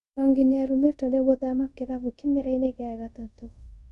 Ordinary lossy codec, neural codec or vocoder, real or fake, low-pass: none; codec, 24 kHz, 0.5 kbps, DualCodec; fake; 10.8 kHz